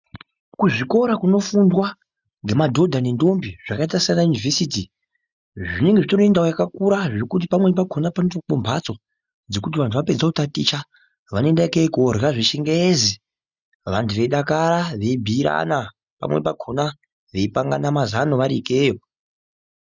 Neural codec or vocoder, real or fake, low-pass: none; real; 7.2 kHz